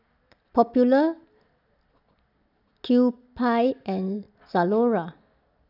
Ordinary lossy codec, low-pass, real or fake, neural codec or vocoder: none; 5.4 kHz; real; none